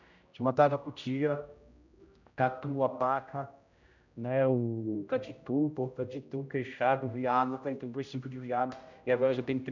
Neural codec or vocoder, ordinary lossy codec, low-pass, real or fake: codec, 16 kHz, 0.5 kbps, X-Codec, HuBERT features, trained on general audio; none; 7.2 kHz; fake